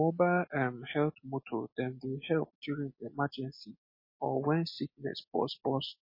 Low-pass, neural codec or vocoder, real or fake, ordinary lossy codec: 5.4 kHz; none; real; MP3, 24 kbps